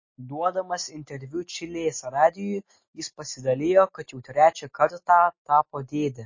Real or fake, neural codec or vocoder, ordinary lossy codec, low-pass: real; none; MP3, 32 kbps; 7.2 kHz